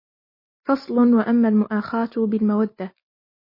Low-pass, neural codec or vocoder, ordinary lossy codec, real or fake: 5.4 kHz; none; MP3, 24 kbps; real